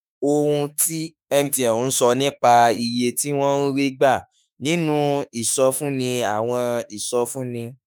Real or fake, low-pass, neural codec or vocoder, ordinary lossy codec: fake; none; autoencoder, 48 kHz, 32 numbers a frame, DAC-VAE, trained on Japanese speech; none